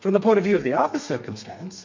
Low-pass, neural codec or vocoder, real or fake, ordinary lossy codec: 7.2 kHz; codec, 32 kHz, 1.9 kbps, SNAC; fake; MP3, 48 kbps